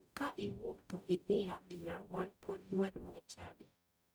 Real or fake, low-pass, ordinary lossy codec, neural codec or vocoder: fake; none; none; codec, 44.1 kHz, 0.9 kbps, DAC